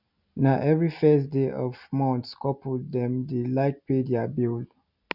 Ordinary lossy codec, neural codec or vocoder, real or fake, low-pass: none; none; real; 5.4 kHz